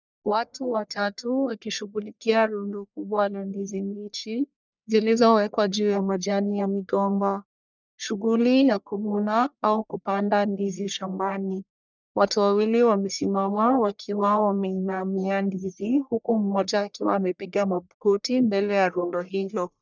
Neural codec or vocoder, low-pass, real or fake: codec, 44.1 kHz, 1.7 kbps, Pupu-Codec; 7.2 kHz; fake